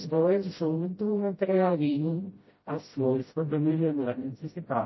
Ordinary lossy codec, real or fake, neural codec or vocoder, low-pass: MP3, 24 kbps; fake; codec, 16 kHz, 0.5 kbps, FreqCodec, smaller model; 7.2 kHz